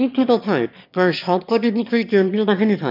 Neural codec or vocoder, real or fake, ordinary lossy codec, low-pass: autoencoder, 22.05 kHz, a latent of 192 numbers a frame, VITS, trained on one speaker; fake; none; 5.4 kHz